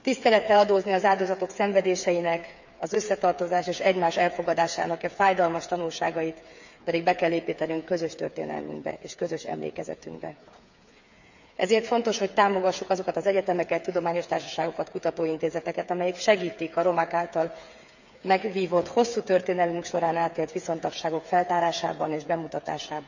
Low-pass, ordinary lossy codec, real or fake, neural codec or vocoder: 7.2 kHz; none; fake; codec, 16 kHz, 8 kbps, FreqCodec, smaller model